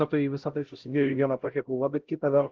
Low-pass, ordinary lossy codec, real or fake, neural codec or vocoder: 7.2 kHz; Opus, 16 kbps; fake; codec, 16 kHz, 0.5 kbps, X-Codec, HuBERT features, trained on LibriSpeech